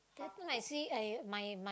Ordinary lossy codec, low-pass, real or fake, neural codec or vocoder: none; none; real; none